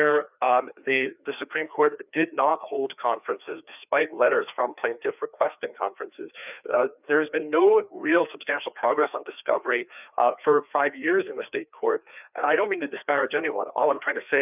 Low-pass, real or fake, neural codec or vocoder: 3.6 kHz; fake; codec, 16 kHz, 2 kbps, FreqCodec, larger model